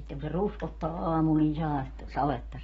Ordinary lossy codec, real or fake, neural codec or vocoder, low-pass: AAC, 24 kbps; real; none; 19.8 kHz